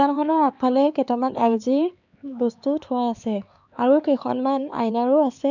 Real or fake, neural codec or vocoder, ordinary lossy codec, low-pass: fake; codec, 16 kHz, 4 kbps, X-Codec, HuBERT features, trained on LibriSpeech; none; 7.2 kHz